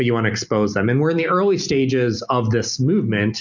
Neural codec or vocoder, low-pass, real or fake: none; 7.2 kHz; real